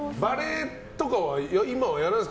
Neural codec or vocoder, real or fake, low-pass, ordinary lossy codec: none; real; none; none